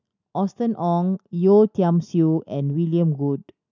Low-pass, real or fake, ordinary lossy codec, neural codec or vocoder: 7.2 kHz; real; none; none